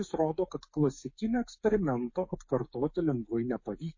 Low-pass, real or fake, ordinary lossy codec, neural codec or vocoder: 7.2 kHz; fake; MP3, 32 kbps; codec, 16 kHz, 8 kbps, FreqCodec, smaller model